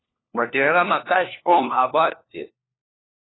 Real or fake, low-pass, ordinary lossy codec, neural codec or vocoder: fake; 7.2 kHz; AAC, 16 kbps; codec, 16 kHz, 1 kbps, FunCodec, trained on LibriTTS, 50 frames a second